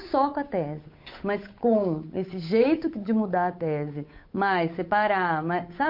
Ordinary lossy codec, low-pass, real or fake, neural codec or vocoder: MP3, 32 kbps; 5.4 kHz; fake; codec, 16 kHz, 8 kbps, FunCodec, trained on Chinese and English, 25 frames a second